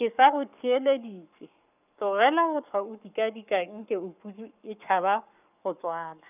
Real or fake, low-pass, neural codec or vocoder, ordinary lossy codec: fake; 3.6 kHz; codec, 44.1 kHz, 7.8 kbps, Pupu-Codec; none